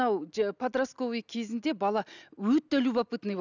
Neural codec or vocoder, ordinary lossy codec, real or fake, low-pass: none; none; real; 7.2 kHz